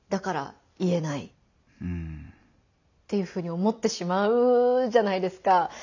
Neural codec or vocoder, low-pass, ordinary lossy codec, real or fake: none; 7.2 kHz; none; real